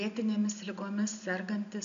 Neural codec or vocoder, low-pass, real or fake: none; 7.2 kHz; real